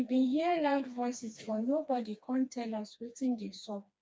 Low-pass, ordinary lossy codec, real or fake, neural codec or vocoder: none; none; fake; codec, 16 kHz, 2 kbps, FreqCodec, smaller model